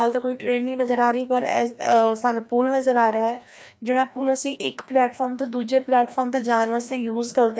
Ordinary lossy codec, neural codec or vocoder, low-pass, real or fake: none; codec, 16 kHz, 1 kbps, FreqCodec, larger model; none; fake